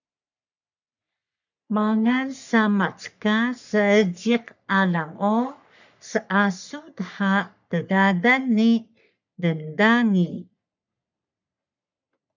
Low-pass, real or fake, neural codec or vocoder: 7.2 kHz; fake; codec, 44.1 kHz, 3.4 kbps, Pupu-Codec